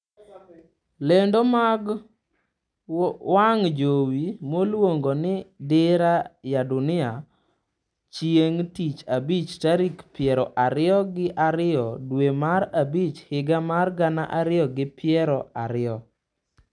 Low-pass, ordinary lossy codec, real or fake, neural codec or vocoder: 9.9 kHz; none; real; none